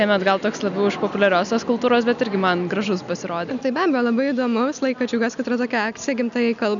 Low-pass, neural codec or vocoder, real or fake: 7.2 kHz; none; real